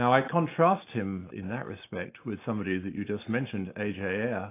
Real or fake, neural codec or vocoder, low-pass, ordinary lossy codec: real; none; 3.6 kHz; AAC, 24 kbps